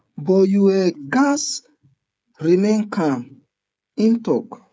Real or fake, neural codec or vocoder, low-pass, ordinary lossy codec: fake; codec, 16 kHz, 16 kbps, FreqCodec, smaller model; none; none